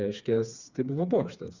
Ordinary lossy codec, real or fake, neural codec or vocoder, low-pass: Opus, 64 kbps; fake; codec, 16 kHz, 4 kbps, FreqCodec, smaller model; 7.2 kHz